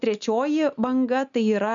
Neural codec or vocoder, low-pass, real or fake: none; 7.2 kHz; real